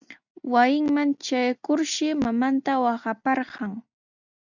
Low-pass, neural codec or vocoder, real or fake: 7.2 kHz; none; real